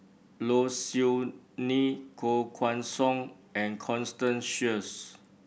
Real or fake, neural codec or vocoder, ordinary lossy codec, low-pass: real; none; none; none